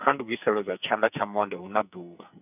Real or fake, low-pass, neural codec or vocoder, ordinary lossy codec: fake; 3.6 kHz; codec, 44.1 kHz, 2.6 kbps, SNAC; none